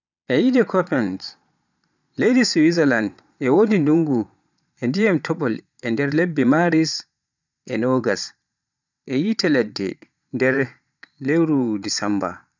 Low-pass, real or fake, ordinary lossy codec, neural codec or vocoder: 7.2 kHz; fake; none; vocoder, 22.05 kHz, 80 mel bands, Vocos